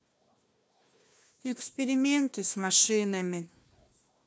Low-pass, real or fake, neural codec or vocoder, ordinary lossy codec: none; fake; codec, 16 kHz, 1 kbps, FunCodec, trained on Chinese and English, 50 frames a second; none